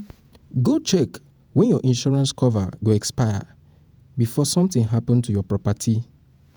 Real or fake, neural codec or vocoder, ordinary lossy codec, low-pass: fake; vocoder, 48 kHz, 128 mel bands, Vocos; none; none